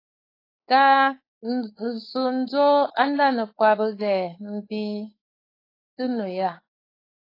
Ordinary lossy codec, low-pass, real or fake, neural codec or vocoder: AAC, 24 kbps; 5.4 kHz; fake; codec, 16 kHz, 4.8 kbps, FACodec